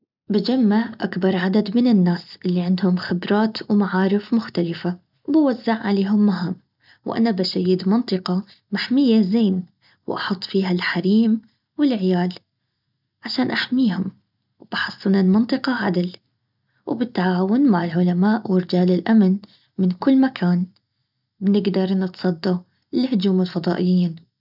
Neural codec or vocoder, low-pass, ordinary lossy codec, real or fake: none; 5.4 kHz; none; real